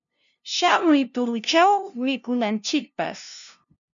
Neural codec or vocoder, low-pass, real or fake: codec, 16 kHz, 0.5 kbps, FunCodec, trained on LibriTTS, 25 frames a second; 7.2 kHz; fake